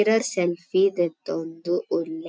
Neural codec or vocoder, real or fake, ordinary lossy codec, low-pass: none; real; none; none